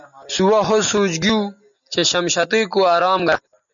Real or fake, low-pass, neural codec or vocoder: real; 7.2 kHz; none